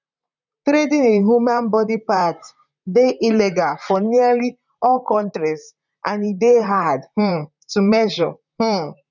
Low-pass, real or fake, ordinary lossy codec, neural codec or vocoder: 7.2 kHz; fake; none; vocoder, 44.1 kHz, 128 mel bands, Pupu-Vocoder